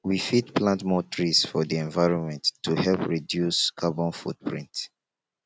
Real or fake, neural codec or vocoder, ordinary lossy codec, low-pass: real; none; none; none